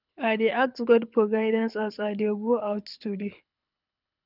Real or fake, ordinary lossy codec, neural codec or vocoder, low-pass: fake; none; codec, 24 kHz, 6 kbps, HILCodec; 5.4 kHz